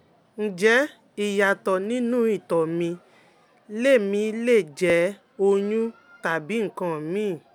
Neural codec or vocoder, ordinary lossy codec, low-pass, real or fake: none; none; 19.8 kHz; real